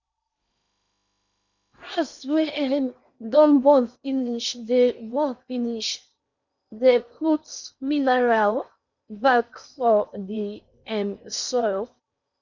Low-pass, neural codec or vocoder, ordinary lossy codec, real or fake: 7.2 kHz; codec, 16 kHz in and 24 kHz out, 0.8 kbps, FocalCodec, streaming, 65536 codes; none; fake